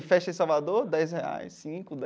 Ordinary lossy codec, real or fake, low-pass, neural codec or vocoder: none; real; none; none